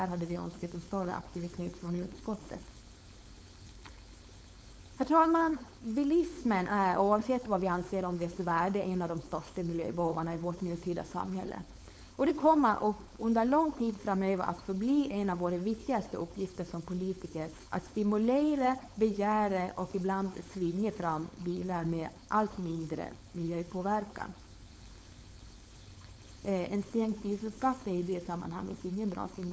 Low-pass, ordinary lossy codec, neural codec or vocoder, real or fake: none; none; codec, 16 kHz, 4.8 kbps, FACodec; fake